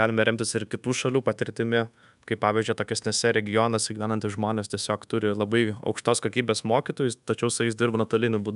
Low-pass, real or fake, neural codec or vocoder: 10.8 kHz; fake; codec, 24 kHz, 1.2 kbps, DualCodec